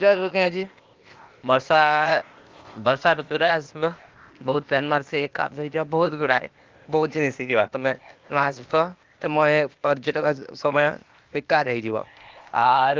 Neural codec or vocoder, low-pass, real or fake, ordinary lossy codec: codec, 16 kHz, 0.8 kbps, ZipCodec; 7.2 kHz; fake; Opus, 32 kbps